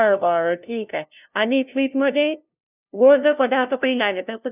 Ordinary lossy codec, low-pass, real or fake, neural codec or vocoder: none; 3.6 kHz; fake; codec, 16 kHz, 0.5 kbps, FunCodec, trained on LibriTTS, 25 frames a second